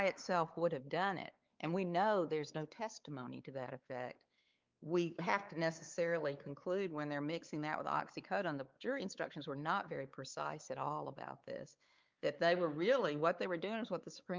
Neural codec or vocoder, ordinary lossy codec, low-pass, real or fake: codec, 16 kHz, 4 kbps, X-Codec, WavLM features, trained on Multilingual LibriSpeech; Opus, 32 kbps; 7.2 kHz; fake